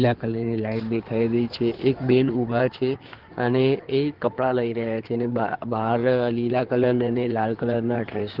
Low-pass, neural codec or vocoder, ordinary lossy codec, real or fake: 5.4 kHz; codec, 16 kHz in and 24 kHz out, 2.2 kbps, FireRedTTS-2 codec; Opus, 16 kbps; fake